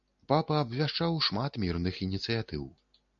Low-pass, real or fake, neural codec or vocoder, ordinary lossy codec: 7.2 kHz; real; none; MP3, 64 kbps